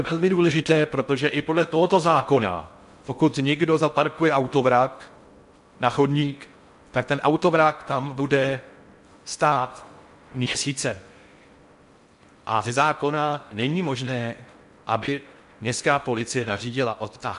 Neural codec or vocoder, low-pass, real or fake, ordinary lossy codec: codec, 16 kHz in and 24 kHz out, 0.6 kbps, FocalCodec, streaming, 4096 codes; 10.8 kHz; fake; MP3, 64 kbps